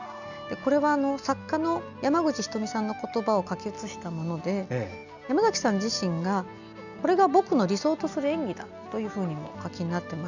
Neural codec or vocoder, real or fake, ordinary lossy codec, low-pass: none; real; none; 7.2 kHz